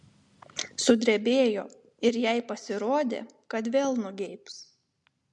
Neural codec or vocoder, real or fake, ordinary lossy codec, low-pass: none; real; MP3, 64 kbps; 10.8 kHz